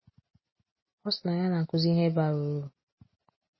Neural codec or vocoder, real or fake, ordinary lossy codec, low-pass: none; real; MP3, 24 kbps; 7.2 kHz